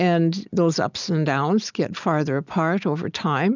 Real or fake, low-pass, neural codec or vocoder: real; 7.2 kHz; none